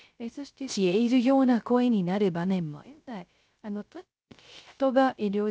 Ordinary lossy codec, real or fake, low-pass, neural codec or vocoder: none; fake; none; codec, 16 kHz, 0.3 kbps, FocalCodec